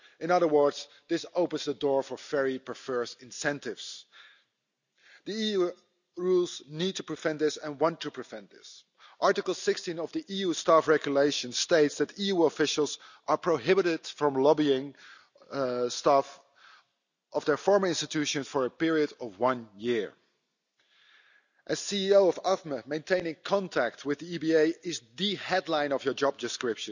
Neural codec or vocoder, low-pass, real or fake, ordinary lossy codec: none; 7.2 kHz; real; none